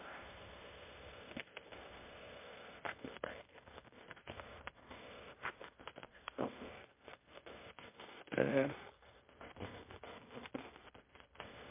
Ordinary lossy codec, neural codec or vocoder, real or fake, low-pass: MP3, 24 kbps; codec, 16 kHz in and 24 kHz out, 0.9 kbps, LongCat-Audio-Codec, fine tuned four codebook decoder; fake; 3.6 kHz